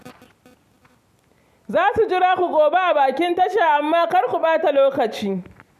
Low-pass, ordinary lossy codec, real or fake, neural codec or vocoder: 14.4 kHz; MP3, 96 kbps; real; none